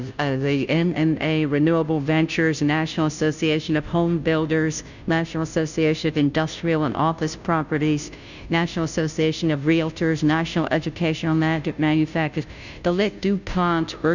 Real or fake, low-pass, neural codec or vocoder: fake; 7.2 kHz; codec, 16 kHz, 0.5 kbps, FunCodec, trained on Chinese and English, 25 frames a second